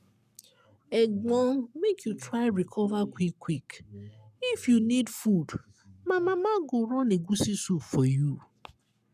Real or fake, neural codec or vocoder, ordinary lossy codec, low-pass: fake; codec, 44.1 kHz, 7.8 kbps, Pupu-Codec; none; 14.4 kHz